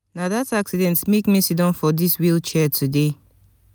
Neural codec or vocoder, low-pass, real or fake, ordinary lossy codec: none; none; real; none